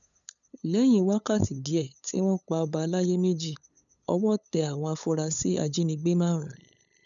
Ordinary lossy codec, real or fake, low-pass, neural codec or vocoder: none; fake; 7.2 kHz; codec, 16 kHz, 8 kbps, FunCodec, trained on LibriTTS, 25 frames a second